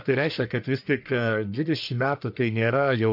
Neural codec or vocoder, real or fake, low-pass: codec, 44.1 kHz, 1.7 kbps, Pupu-Codec; fake; 5.4 kHz